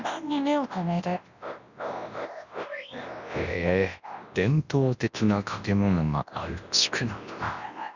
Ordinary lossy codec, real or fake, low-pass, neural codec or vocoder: Opus, 64 kbps; fake; 7.2 kHz; codec, 24 kHz, 0.9 kbps, WavTokenizer, large speech release